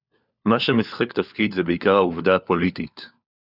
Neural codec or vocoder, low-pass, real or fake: codec, 16 kHz, 4 kbps, FunCodec, trained on LibriTTS, 50 frames a second; 5.4 kHz; fake